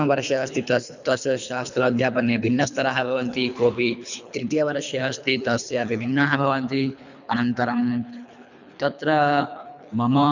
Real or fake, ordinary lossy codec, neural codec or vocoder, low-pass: fake; none; codec, 24 kHz, 3 kbps, HILCodec; 7.2 kHz